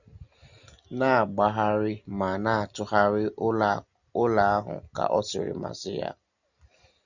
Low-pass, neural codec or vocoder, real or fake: 7.2 kHz; none; real